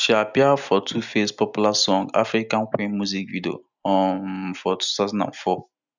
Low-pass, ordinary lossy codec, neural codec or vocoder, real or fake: 7.2 kHz; none; none; real